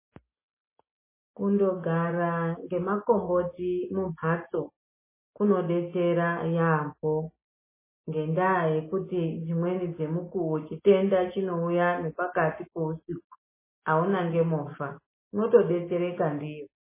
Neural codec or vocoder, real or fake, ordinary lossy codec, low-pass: none; real; MP3, 16 kbps; 3.6 kHz